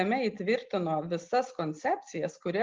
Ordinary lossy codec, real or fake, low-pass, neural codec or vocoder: Opus, 32 kbps; real; 7.2 kHz; none